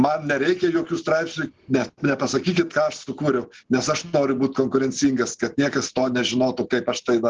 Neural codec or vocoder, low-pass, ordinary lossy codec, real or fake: none; 7.2 kHz; Opus, 16 kbps; real